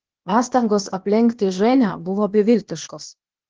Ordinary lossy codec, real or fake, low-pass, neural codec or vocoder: Opus, 16 kbps; fake; 7.2 kHz; codec, 16 kHz, 0.8 kbps, ZipCodec